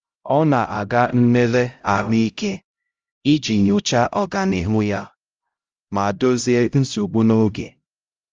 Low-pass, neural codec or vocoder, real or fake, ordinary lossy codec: 7.2 kHz; codec, 16 kHz, 0.5 kbps, X-Codec, HuBERT features, trained on LibriSpeech; fake; Opus, 32 kbps